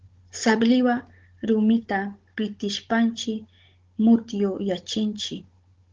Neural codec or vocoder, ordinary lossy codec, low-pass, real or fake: codec, 16 kHz, 16 kbps, FunCodec, trained on Chinese and English, 50 frames a second; Opus, 16 kbps; 7.2 kHz; fake